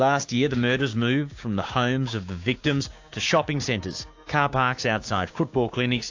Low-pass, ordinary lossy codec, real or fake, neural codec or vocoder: 7.2 kHz; AAC, 48 kbps; fake; codec, 16 kHz, 6 kbps, DAC